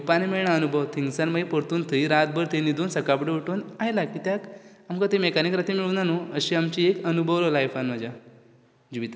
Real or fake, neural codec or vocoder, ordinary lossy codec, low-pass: real; none; none; none